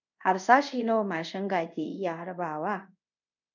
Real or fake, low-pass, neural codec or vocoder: fake; 7.2 kHz; codec, 24 kHz, 0.5 kbps, DualCodec